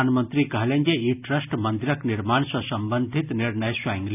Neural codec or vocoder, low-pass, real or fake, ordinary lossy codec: none; 3.6 kHz; real; none